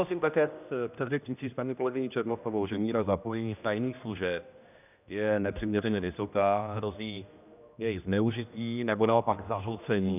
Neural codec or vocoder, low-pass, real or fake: codec, 16 kHz, 1 kbps, X-Codec, HuBERT features, trained on general audio; 3.6 kHz; fake